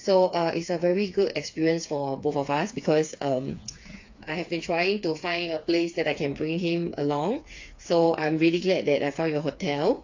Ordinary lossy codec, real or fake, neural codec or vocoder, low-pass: AAC, 48 kbps; fake; codec, 16 kHz, 4 kbps, FreqCodec, smaller model; 7.2 kHz